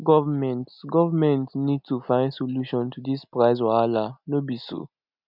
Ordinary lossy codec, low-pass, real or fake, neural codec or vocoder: none; 5.4 kHz; real; none